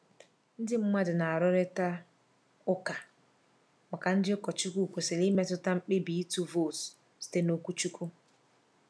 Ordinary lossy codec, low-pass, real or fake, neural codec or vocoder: none; none; real; none